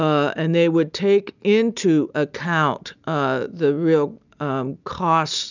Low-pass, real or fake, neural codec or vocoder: 7.2 kHz; real; none